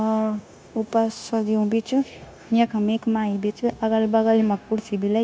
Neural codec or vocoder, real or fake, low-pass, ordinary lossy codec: codec, 16 kHz, 0.9 kbps, LongCat-Audio-Codec; fake; none; none